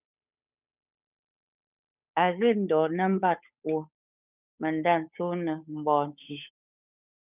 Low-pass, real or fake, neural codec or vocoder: 3.6 kHz; fake; codec, 16 kHz, 8 kbps, FunCodec, trained on Chinese and English, 25 frames a second